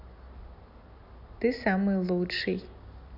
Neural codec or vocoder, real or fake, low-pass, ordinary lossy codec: none; real; 5.4 kHz; none